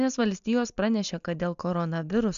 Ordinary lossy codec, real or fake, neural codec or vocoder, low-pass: AAC, 96 kbps; real; none; 7.2 kHz